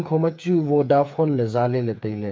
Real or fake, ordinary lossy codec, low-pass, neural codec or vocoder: fake; none; none; codec, 16 kHz, 8 kbps, FreqCodec, smaller model